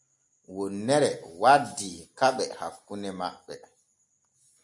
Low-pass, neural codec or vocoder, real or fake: 10.8 kHz; none; real